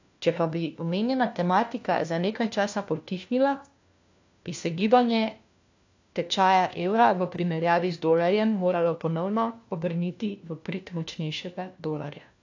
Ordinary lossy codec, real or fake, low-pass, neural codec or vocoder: AAC, 48 kbps; fake; 7.2 kHz; codec, 16 kHz, 1 kbps, FunCodec, trained on LibriTTS, 50 frames a second